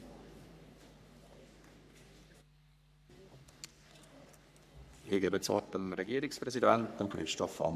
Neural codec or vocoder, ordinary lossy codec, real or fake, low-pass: codec, 44.1 kHz, 3.4 kbps, Pupu-Codec; none; fake; 14.4 kHz